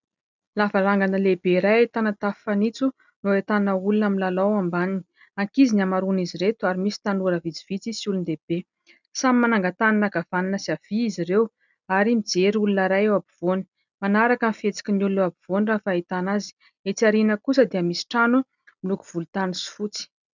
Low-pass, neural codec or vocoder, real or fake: 7.2 kHz; none; real